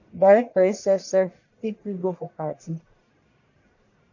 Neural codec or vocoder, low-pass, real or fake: codec, 44.1 kHz, 1.7 kbps, Pupu-Codec; 7.2 kHz; fake